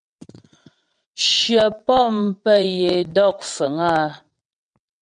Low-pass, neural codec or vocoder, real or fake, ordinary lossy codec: 9.9 kHz; vocoder, 22.05 kHz, 80 mel bands, WaveNeXt; fake; MP3, 96 kbps